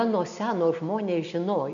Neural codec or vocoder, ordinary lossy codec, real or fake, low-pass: none; MP3, 96 kbps; real; 7.2 kHz